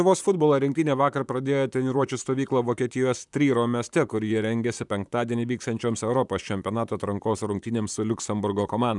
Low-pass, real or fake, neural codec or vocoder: 10.8 kHz; fake; vocoder, 44.1 kHz, 128 mel bands, Pupu-Vocoder